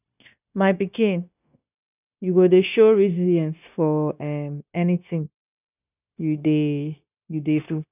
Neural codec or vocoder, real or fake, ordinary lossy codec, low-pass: codec, 16 kHz, 0.9 kbps, LongCat-Audio-Codec; fake; none; 3.6 kHz